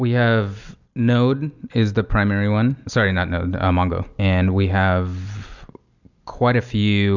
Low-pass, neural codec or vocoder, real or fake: 7.2 kHz; none; real